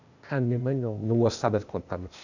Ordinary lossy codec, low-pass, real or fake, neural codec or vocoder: none; 7.2 kHz; fake; codec, 16 kHz, 0.8 kbps, ZipCodec